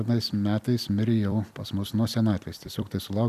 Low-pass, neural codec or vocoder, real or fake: 14.4 kHz; vocoder, 44.1 kHz, 128 mel bands every 256 samples, BigVGAN v2; fake